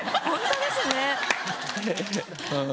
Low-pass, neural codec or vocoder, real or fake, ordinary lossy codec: none; none; real; none